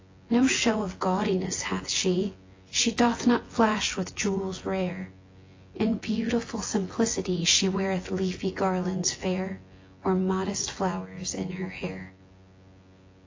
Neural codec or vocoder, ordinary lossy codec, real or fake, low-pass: vocoder, 24 kHz, 100 mel bands, Vocos; AAC, 32 kbps; fake; 7.2 kHz